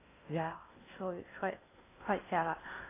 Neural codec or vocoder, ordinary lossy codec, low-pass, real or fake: codec, 16 kHz in and 24 kHz out, 0.6 kbps, FocalCodec, streaming, 2048 codes; AAC, 16 kbps; 3.6 kHz; fake